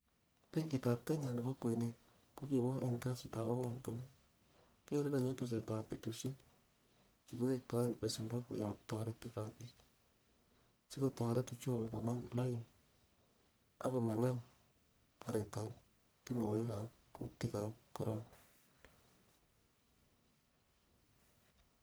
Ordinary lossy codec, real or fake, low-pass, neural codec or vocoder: none; fake; none; codec, 44.1 kHz, 1.7 kbps, Pupu-Codec